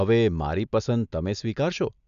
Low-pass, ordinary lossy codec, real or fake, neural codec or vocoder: 7.2 kHz; none; real; none